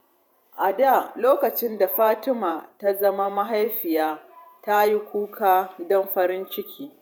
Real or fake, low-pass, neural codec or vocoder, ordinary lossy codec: fake; none; vocoder, 48 kHz, 128 mel bands, Vocos; none